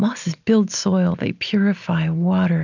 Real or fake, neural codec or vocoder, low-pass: real; none; 7.2 kHz